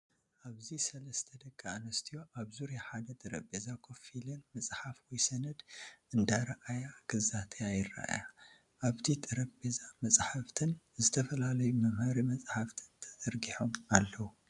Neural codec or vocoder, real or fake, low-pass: none; real; 10.8 kHz